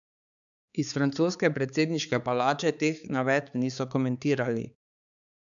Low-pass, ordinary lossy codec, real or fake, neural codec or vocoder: 7.2 kHz; none; fake; codec, 16 kHz, 4 kbps, X-Codec, HuBERT features, trained on balanced general audio